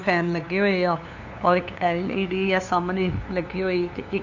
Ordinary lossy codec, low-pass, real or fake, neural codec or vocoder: none; 7.2 kHz; fake; codec, 16 kHz, 2 kbps, FunCodec, trained on LibriTTS, 25 frames a second